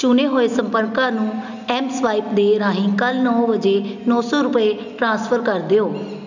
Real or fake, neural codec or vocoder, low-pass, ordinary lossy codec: real; none; 7.2 kHz; none